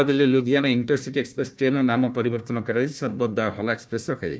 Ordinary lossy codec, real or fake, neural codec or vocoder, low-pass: none; fake; codec, 16 kHz, 1 kbps, FunCodec, trained on Chinese and English, 50 frames a second; none